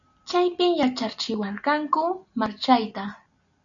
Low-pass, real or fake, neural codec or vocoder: 7.2 kHz; real; none